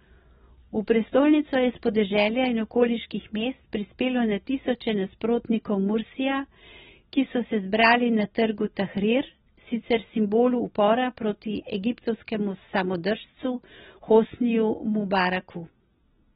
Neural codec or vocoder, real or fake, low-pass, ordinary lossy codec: none; real; 10.8 kHz; AAC, 16 kbps